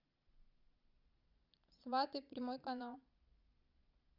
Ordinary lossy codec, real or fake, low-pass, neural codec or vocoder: Opus, 64 kbps; fake; 5.4 kHz; vocoder, 22.05 kHz, 80 mel bands, Vocos